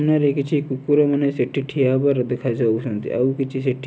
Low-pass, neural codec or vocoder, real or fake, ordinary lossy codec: none; none; real; none